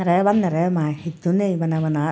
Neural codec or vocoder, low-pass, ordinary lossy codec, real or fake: none; none; none; real